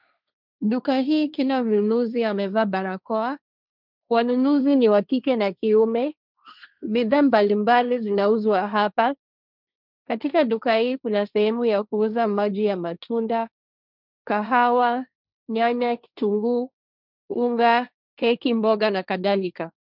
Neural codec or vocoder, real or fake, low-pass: codec, 16 kHz, 1.1 kbps, Voila-Tokenizer; fake; 5.4 kHz